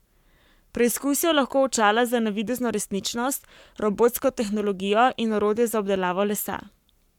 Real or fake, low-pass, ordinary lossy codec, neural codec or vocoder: fake; 19.8 kHz; none; codec, 44.1 kHz, 7.8 kbps, Pupu-Codec